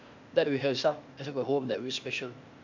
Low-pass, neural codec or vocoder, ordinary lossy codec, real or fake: 7.2 kHz; codec, 16 kHz, 0.8 kbps, ZipCodec; none; fake